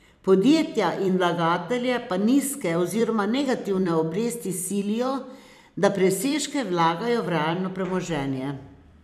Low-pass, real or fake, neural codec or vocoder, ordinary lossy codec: 14.4 kHz; fake; vocoder, 44.1 kHz, 128 mel bands every 256 samples, BigVGAN v2; none